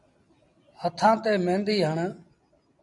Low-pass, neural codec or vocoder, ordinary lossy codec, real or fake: 10.8 kHz; vocoder, 44.1 kHz, 128 mel bands every 256 samples, BigVGAN v2; MP3, 64 kbps; fake